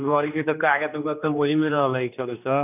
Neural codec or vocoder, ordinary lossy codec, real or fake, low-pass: codec, 16 kHz, 2 kbps, X-Codec, HuBERT features, trained on general audio; none; fake; 3.6 kHz